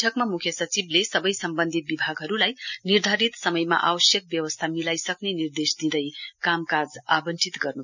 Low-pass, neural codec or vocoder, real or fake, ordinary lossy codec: 7.2 kHz; none; real; none